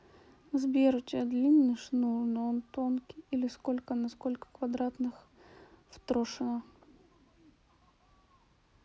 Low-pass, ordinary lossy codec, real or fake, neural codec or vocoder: none; none; real; none